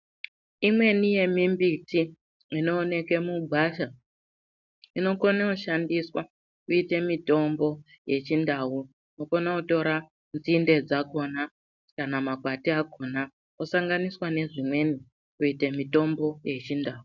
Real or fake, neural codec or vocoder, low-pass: real; none; 7.2 kHz